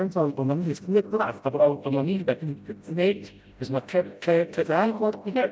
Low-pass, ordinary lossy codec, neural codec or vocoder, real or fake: none; none; codec, 16 kHz, 0.5 kbps, FreqCodec, smaller model; fake